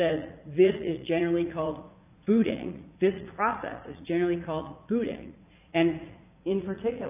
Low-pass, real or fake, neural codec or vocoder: 3.6 kHz; fake; vocoder, 44.1 kHz, 80 mel bands, Vocos